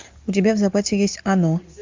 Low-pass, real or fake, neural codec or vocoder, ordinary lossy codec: 7.2 kHz; real; none; MP3, 64 kbps